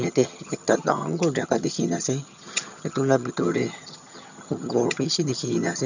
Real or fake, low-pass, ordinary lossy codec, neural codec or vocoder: fake; 7.2 kHz; none; vocoder, 22.05 kHz, 80 mel bands, HiFi-GAN